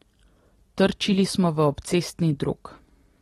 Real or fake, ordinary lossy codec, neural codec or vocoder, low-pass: real; AAC, 32 kbps; none; 19.8 kHz